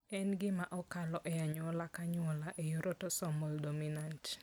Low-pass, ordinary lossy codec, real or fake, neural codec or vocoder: none; none; real; none